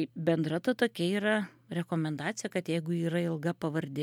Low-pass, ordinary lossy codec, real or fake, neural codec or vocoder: 19.8 kHz; MP3, 96 kbps; real; none